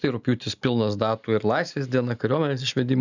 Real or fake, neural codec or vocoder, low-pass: real; none; 7.2 kHz